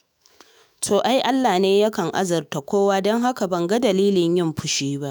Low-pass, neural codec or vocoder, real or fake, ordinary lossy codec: none; autoencoder, 48 kHz, 128 numbers a frame, DAC-VAE, trained on Japanese speech; fake; none